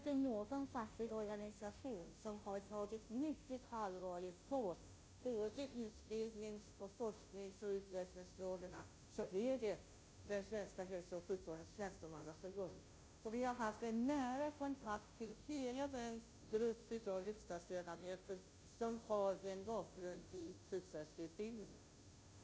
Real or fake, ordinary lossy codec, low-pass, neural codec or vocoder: fake; none; none; codec, 16 kHz, 0.5 kbps, FunCodec, trained on Chinese and English, 25 frames a second